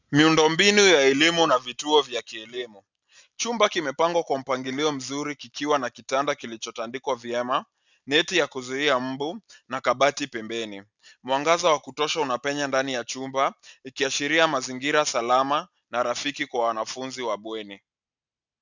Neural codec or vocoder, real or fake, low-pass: none; real; 7.2 kHz